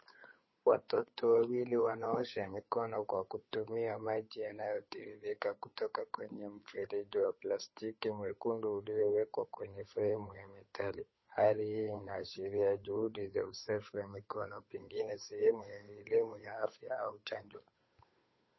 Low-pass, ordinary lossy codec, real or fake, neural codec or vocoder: 7.2 kHz; MP3, 24 kbps; fake; codec, 16 kHz, 8 kbps, FunCodec, trained on Chinese and English, 25 frames a second